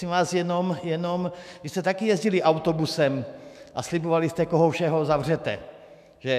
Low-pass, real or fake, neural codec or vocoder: 14.4 kHz; fake; autoencoder, 48 kHz, 128 numbers a frame, DAC-VAE, trained on Japanese speech